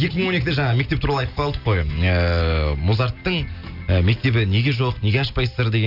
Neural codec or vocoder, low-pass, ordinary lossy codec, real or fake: none; 5.4 kHz; none; real